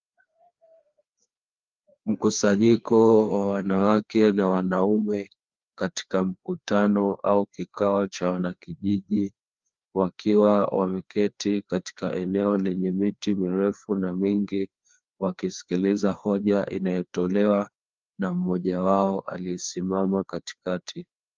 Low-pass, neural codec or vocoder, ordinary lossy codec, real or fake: 7.2 kHz; codec, 16 kHz, 2 kbps, FreqCodec, larger model; Opus, 32 kbps; fake